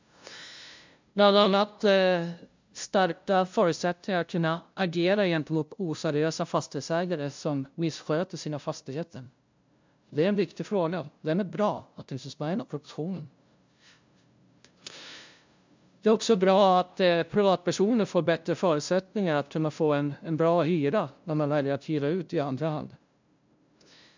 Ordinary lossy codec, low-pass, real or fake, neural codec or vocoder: MP3, 64 kbps; 7.2 kHz; fake; codec, 16 kHz, 0.5 kbps, FunCodec, trained on LibriTTS, 25 frames a second